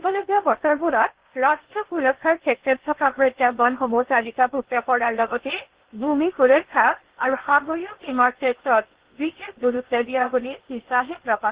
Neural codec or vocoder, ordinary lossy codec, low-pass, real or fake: codec, 16 kHz in and 24 kHz out, 0.6 kbps, FocalCodec, streaming, 2048 codes; Opus, 16 kbps; 3.6 kHz; fake